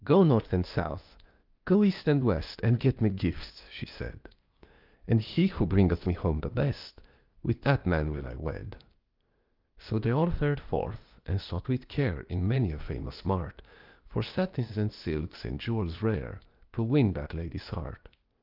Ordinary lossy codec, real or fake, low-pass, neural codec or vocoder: Opus, 24 kbps; fake; 5.4 kHz; codec, 16 kHz, 0.8 kbps, ZipCodec